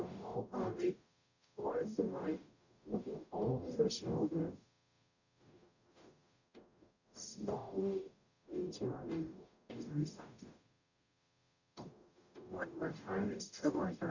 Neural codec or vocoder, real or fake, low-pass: codec, 44.1 kHz, 0.9 kbps, DAC; fake; 7.2 kHz